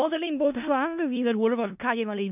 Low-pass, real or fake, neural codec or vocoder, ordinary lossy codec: 3.6 kHz; fake; codec, 16 kHz in and 24 kHz out, 0.4 kbps, LongCat-Audio-Codec, four codebook decoder; none